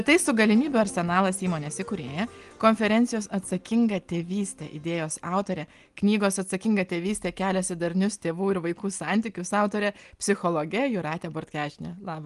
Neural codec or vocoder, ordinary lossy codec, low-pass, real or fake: none; Opus, 24 kbps; 10.8 kHz; real